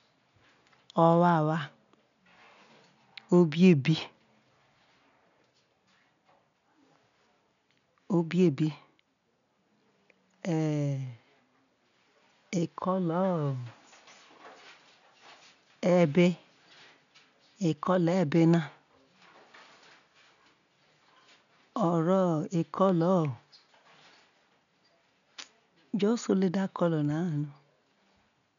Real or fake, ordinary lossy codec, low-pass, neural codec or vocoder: real; none; 7.2 kHz; none